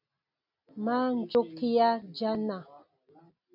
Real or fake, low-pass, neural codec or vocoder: real; 5.4 kHz; none